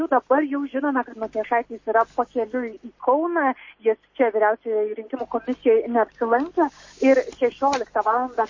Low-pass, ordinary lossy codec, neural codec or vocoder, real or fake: 7.2 kHz; MP3, 32 kbps; none; real